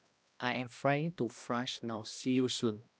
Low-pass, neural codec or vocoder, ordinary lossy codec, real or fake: none; codec, 16 kHz, 1 kbps, X-Codec, HuBERT features, trained on balanced general audio; none; fake